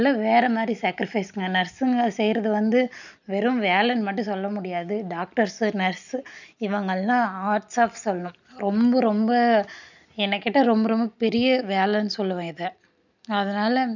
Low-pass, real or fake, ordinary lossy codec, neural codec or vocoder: 7.2 kHz; real; none; none